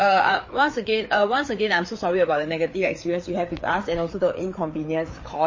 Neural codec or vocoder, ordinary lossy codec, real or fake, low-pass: codec, 16 kHz, 4 kbps, FreqCodec, larger model; MP3, 32 kbps; fake; 7.2 kHz